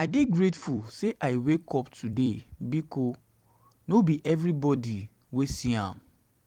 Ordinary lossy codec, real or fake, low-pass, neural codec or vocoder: Opus, 24 kbps; fake; 19.8 kHz; vocoder, 44.1 kHz, 128 mel bands every 256 samples, BigVGAN v2